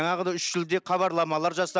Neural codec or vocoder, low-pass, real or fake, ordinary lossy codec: none; none; real; none